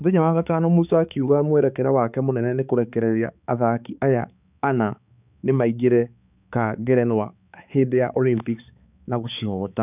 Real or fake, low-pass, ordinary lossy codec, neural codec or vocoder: fake; 3.6 kHz; none; codec, 16 kHz, 4 kbps, X-Codec, HuBERT features, trained on balanced general audio